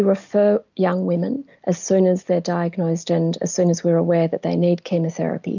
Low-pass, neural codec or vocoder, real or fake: 7.2 kHz; none; real